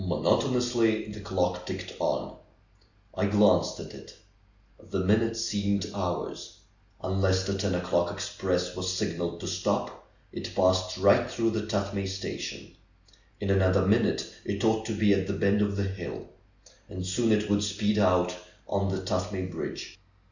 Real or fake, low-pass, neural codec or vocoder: real; 7.2 kHz; none